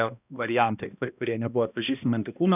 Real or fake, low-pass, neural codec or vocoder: fake; 3.6 kHz; codec, 16 kHz, 1 kbps, X-Codec, HuBERT features, trained on balanced general audio